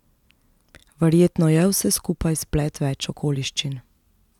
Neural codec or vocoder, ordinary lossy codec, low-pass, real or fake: none; none; 19.8 kHz; real